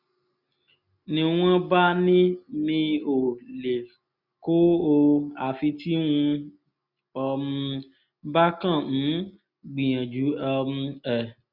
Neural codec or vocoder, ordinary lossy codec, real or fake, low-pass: none; none; real; 5.4 kHz